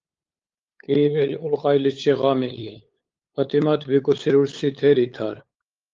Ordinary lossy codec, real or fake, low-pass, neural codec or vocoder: Opus, 32 kbps; fake; 7.2 kHz; codec, 16 kHz, 8 kbps, FunCodec, trained on LibriTTS, 25 frames a second